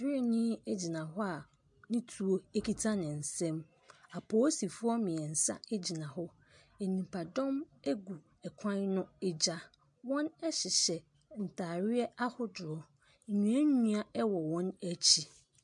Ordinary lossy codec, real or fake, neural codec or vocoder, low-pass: MP3, 64 kbps; real; none; 10.8 kHz